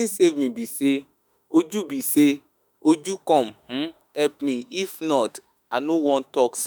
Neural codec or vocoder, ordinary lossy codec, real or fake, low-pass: autoencoder, 48 kHz, 32 numbers a frame, DAC-VAE, trained on Japanese speech; none; fake; none